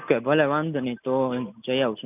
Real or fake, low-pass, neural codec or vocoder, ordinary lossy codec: real; 3.6 kHz; none; none